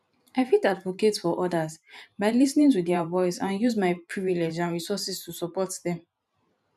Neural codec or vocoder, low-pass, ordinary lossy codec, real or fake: vocoder, 44.1 kHz, 128 mel bands every 512 samples, BigVGAN v2; 14.4 kHz; none; fake